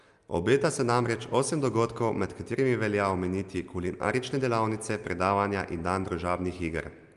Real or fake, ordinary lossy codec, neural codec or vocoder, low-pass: real; Opus, 32 kbps; none; 10.8 kHz